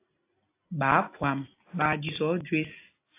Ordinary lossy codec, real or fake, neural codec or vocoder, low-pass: AAC, 24 kbps; real; none; 3.6 kHz